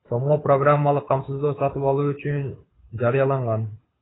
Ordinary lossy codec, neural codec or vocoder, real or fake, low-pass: AAC, 16 kbps; codec, 24 kHz, 6 kbps, HILCodec; fake; 7.2 kHz